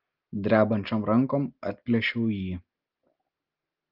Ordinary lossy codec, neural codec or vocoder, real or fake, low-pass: Opus, 32 kbps; none; real; 5.4 kHz